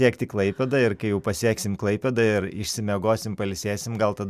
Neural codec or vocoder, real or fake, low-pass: none; real; 14.4 kHz